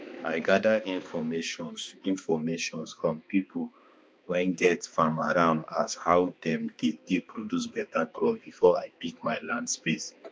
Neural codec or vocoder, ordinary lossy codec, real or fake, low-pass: codec, 16 kHz, 2 kbps, X-Codec, HuBERT features, trained on balanced general audio; none; fake; none